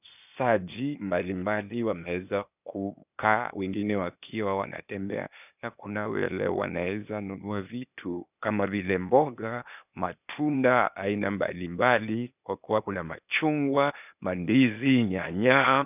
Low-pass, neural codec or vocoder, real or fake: 3.6 kHz; codec, 16 kHz, 0.8 kbps, ZipCodec; fake